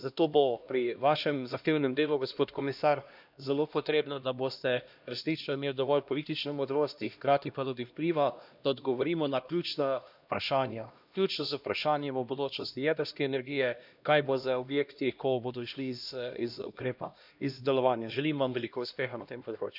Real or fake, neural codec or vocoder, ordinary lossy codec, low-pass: fake; codec, 16 kHz, 1 kbps, X-Codec, HuBERT features, trained on LibriSpeech; none; 5.4 kHz